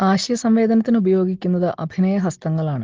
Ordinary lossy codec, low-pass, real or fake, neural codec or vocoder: Opus, 16 kbps; 7.2 kHz; real; none